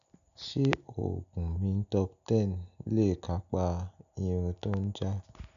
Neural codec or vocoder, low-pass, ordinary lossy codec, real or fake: none; 7.2 kHz; none; real